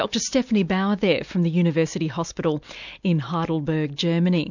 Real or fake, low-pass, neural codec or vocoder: real; 7.2 kHz; none